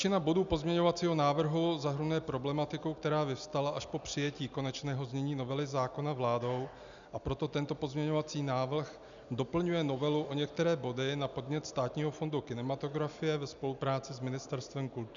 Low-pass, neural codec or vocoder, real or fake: 7.2 kHz; none; real